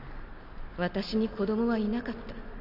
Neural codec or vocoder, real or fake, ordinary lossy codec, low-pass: none; real; none; 5.4 kHz